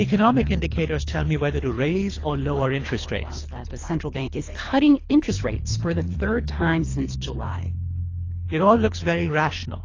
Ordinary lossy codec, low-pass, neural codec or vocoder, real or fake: AAC, 32 kbps; 7.2 kHz; codec, 24 kHz, 3 kbps, HILCodec; fake